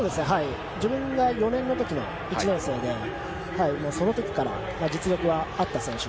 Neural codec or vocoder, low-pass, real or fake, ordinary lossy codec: none; none; real; none